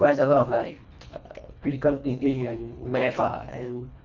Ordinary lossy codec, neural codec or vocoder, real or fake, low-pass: none; codec, 24 kHz, 1.5 kbps, HILCodec; fake; 7.2 kHz